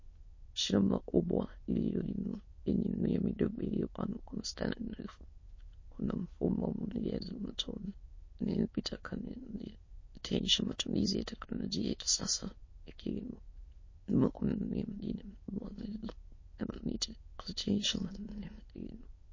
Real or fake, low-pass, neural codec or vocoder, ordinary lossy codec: fake; 7.2 kHz; autoencoder, 22.05 kHz, a latent of 192 numbers a frame, VITS, trained on many speakers; MP3, 32 kbps